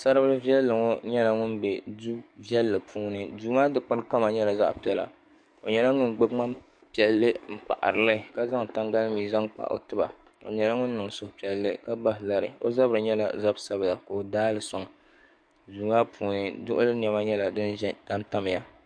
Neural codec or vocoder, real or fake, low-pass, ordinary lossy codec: codec, 44.1 kHz, 7.8 kbps, DAC; fake; 9.9 kHz; MP3, 64 kbps